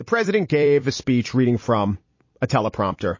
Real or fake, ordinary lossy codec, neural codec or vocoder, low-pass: fake; MP3, 32 kbps; vocoder, 44.1 kHz, 128 mel bands every 256 samples, BigVGAN v2; 7.2 kHz